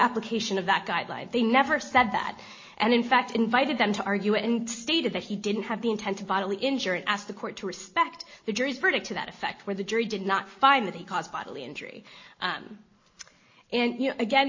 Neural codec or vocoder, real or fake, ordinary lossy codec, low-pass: none; real; MP3, 32 kbps; 7.2 kHz